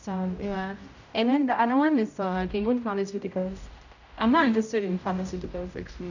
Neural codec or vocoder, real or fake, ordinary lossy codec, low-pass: codec, 16 kHz, 0.5 kbps, X-Codec, HuBERT features, trained on general audio; fake; none; 7.2 kHz